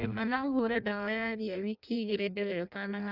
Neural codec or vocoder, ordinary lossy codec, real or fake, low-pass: codec, 16 kHz in and 24 kHz out, 0.6 kbps, FireRedTTS-2 codec; none; fake; 5.4 kHz